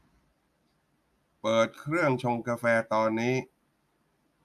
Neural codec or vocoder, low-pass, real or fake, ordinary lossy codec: none; 14.4 kHz; real; AAC, 96 kbps